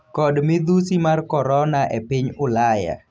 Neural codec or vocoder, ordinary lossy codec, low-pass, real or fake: none; none; none; real